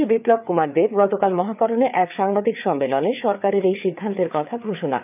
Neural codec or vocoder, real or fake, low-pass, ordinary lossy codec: codec, 16 kHz, 4 kbps, FreqCodec, larger model; fake; 3.6 kHz; none